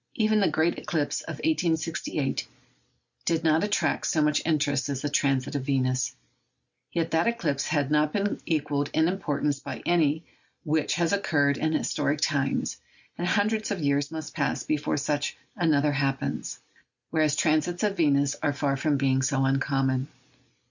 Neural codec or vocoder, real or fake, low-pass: none; real; 7.2 kHz